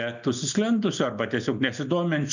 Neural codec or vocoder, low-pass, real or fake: none; 7.2 kHz; real